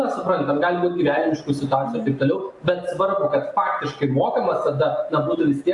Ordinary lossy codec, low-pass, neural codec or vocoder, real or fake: AAC, 64 kbps; 10.8 kHz; none; real